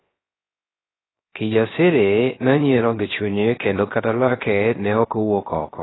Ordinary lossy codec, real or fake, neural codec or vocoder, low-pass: AAC, 16 kbps; fake; codec, 16 kHz, 0.3 kbps, FocalCodec; 7.2 kHz